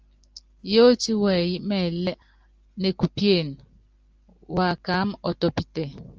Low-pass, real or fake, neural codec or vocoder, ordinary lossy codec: 7.2 kHz; real; none; Opus, 24 kbps